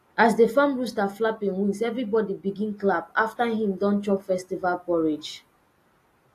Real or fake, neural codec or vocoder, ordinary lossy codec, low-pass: real; none; AAC, 48 kbps; 14.4 kHz